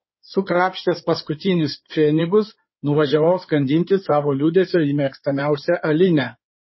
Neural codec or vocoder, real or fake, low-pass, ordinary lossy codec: codec, 16 kHz in and 24 kHz out, 2.2 kbps, FireRedTTS-2 codec; fake; 7.2 kHz; MP3, 24 kbps